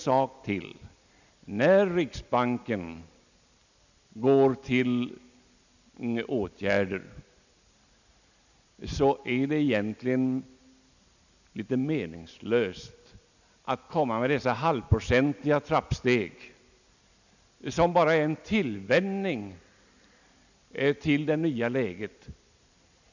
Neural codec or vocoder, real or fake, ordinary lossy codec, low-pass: none; real; none; 7.2 kHz